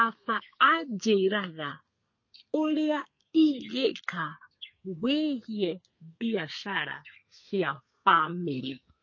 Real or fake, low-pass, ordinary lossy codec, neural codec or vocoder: fake; 7.2 kHz; MP3, 32 kbps; codec, 32 kHz, 1.9 kbps, SNAC